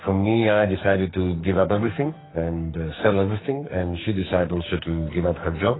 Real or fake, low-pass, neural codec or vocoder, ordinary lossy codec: fake; 7.2 kHz; codec, 44.1 kHz, 2.6 kbps, SNAC; AAC, 16 kbps